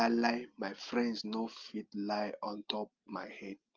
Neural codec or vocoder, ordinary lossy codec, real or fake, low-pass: none; Opus, 24 kbps; real; 7.2 kHz